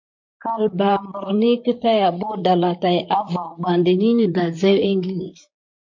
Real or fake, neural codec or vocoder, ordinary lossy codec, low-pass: fake; vocoder, 44.1 kHz, 128 mel bands, Pupu-Vocoder; MP3, 48 kbps; 7.2 kHz